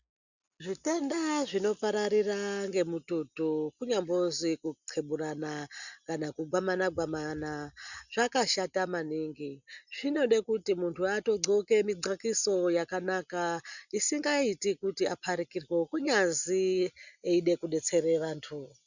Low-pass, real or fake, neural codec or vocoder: 7.2 kHz; real; none